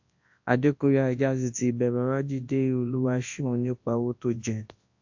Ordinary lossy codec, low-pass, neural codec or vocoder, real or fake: AAC, 48 kbps; 7.2 kHz; codec, 24 kHz, 0.9 kbps, WavTokenizer, large speech release; fake